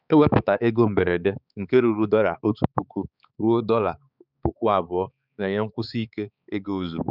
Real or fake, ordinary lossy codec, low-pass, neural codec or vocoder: fake; none; 5.4 kHz; codec, 16 kHz, 4 kbps, X-Codec, HuBERT features, trained on balanced general audio